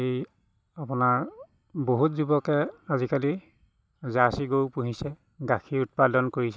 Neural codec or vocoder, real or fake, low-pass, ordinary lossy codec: none; real; none; none